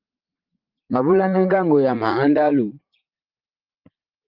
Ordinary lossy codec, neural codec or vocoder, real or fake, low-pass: Opus, 32 kbps; vocoder, 44.1 kHz, 80 mel bands, Vocos; fake; 5.4 kHz